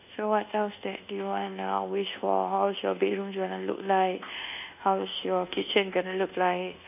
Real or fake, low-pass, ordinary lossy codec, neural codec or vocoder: fake; 3.6 kHz; none; codec, 24 kHz, 1.2 kbps, DualCodec